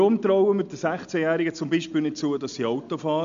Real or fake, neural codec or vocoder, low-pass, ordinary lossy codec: real; none; 7.2 kHz; AAC, 64 kbps